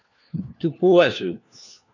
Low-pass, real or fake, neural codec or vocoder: 7.2 kHz; fake; codec, 16 kHz, 1 kbps, FunCodec, trained on LibriTTS, 50 frames a second